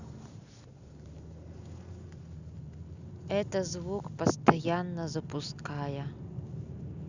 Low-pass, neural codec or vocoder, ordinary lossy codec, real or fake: 7.2 kHz; none; none; real